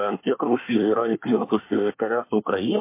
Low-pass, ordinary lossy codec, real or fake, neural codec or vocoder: 3.6 kHz; MP3, 24 kbps; fake; codec, 24 kHz, 1 kbps, SNAC